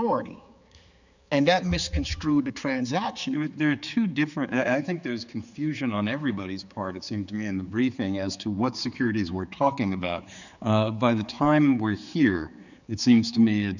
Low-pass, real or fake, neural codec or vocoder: 7.2 kHz; fake; codec, 16 kHz, 4 kbps, X-Codec, HuBERT features, trained on general audio